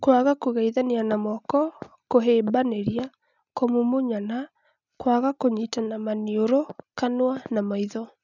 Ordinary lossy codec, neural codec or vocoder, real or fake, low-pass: none; none; real; 7.2 kHz